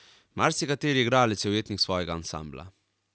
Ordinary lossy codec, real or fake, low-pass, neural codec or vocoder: none; real; none; none